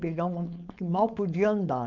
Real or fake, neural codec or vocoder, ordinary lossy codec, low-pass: fake; codec, 16 kHz, 4.8 kbps, FACodec; none; 7.2 kHz